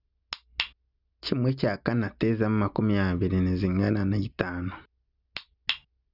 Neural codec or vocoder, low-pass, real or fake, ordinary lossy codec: none; 5.4 kHz; real; none